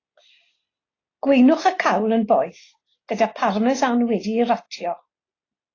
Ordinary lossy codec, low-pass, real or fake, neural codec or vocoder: AAC, 32 kbps; 7.2 kHz; real; none